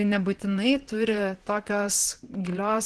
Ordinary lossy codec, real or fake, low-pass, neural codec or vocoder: Opus, 16 kbps; fake; 10.8 kHz; vocoder, 44.1 kHz, 128 mel bands, Pupu-Vocoder